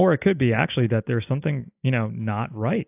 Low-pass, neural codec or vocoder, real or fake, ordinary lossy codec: 3.6 kHz; none; real; AAC, 32 kbps